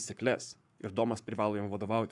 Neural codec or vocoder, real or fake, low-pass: codec, 44.1 kHz, 7.8 kbps, Pupu-Codec; fake; 10.8 kHz